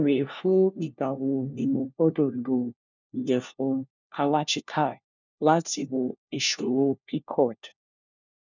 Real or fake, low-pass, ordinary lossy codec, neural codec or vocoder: fake; 7.2 kHz; none; codec, 16 kHz, 1 kbps, FunCodec, trained on LibriTTS, 50 frames a second